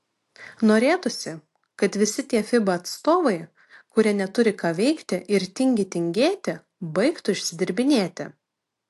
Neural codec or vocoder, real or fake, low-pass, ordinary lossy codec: none; real; 14.4 kHz; AAC, 64 kbps